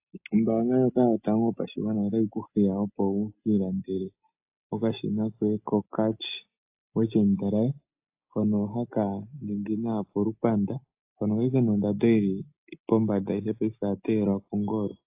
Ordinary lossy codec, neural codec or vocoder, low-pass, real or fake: AAC, 32 kbps; none; 3.6 kHz; real